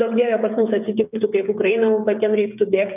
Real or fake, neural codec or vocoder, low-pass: fake; codec, 16 kHz, 16 kbps, FreqCodec, smaller model; 3.6 kHz